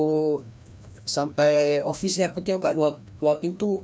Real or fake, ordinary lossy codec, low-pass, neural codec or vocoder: fake; none; none; codec, 16 kHz, 1 kbps, FreqCodec, larger model